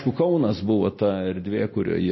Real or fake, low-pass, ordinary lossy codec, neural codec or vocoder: real; 7.2 kHz; MP3, 24 kbps; none